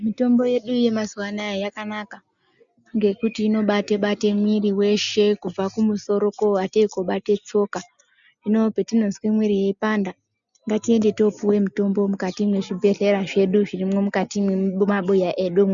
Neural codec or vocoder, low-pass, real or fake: none; 7.2 kHz; real